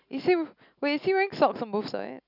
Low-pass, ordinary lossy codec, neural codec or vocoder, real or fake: 5.4 kHz; none; none; real